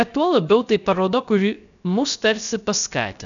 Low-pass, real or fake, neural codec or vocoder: 7.2 kHz; fake; codec, 16 kHz, 0.3 kbps, FocalCodec